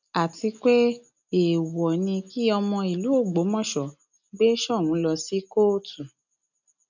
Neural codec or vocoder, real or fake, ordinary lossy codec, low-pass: none; real; none; 7.2 kHz